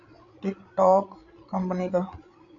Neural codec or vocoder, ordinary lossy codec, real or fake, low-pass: codec, 16 kHz, 8 kbps, FreqCodec, larger model; MP3, 96 kbps; fake; 7.2 kHz